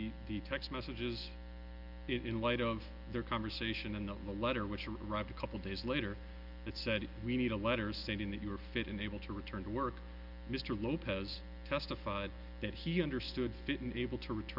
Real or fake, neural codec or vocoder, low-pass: real; none; 5.4 kHz